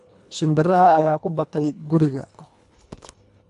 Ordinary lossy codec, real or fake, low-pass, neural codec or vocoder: AAC, 64 kbps; fake; 10.8 kHz; codec, 24 kHz, 1.5 kbps, HILCodec